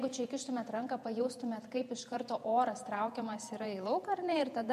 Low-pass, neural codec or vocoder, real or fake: 14.4 kHz; vocoder, 48 kHz, 128 mel bands, Vocos; fake